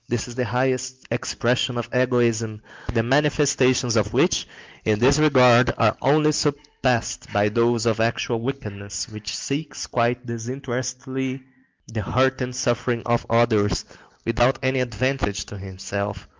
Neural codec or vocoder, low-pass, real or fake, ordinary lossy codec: none; 7.2 kHz; real; Opus, 32 kbps